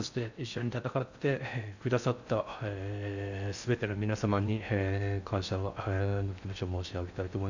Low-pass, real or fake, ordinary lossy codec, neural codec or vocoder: 7.2 kHz; fake; none; codec, 16 kHz in and 24 kHz out, 0.6 kbps, FocalCodec, streaming, 4096 codes